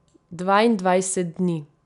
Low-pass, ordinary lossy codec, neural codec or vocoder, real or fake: 10.8 kHz; none; none; real